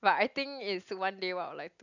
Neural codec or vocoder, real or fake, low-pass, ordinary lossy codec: none; real; 7.2 kHz; none